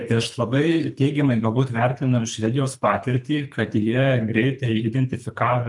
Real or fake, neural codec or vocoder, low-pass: fake; codec, 24 kHz, 3 kbps, HILCodec; 10.8 kHz